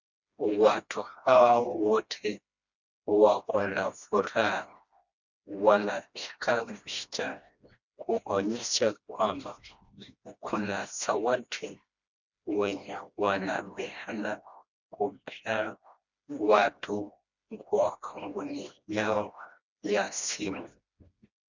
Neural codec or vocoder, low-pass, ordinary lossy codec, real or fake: codec, 16 kHz, 1 kbps, FreqCodec, smaller model; 7.2 kHz; Opus, 64 kbps; fake